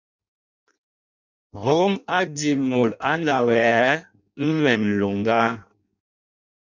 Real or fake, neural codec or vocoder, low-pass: fake; codec, 16 kHz in and 24 kHz out, 0.6 kbps, FireRedTTS-2 codec; 7.2 kHz